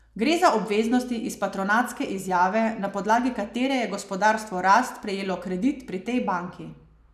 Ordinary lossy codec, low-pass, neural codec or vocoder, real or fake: none; 14.4 kHz; none; real